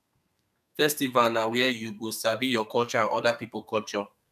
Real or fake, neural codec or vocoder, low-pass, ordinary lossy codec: fake; codec, 32 kHz, 1.9 kbps, SNAC; 14.4 kHz; none